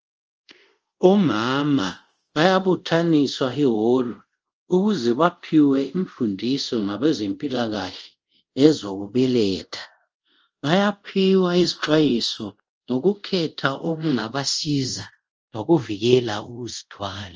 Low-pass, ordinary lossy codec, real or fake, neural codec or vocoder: 7.2 kHz; Opus, 24 kbps; fake; codec, 24 kHz, 0.5 kbps, DualCodec